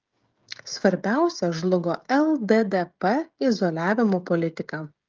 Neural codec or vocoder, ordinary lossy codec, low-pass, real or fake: codec, 16 kHz, 16 kbps, FreqCodec, smaller model; Opus, 32 kbps; 7.2 kHz; fake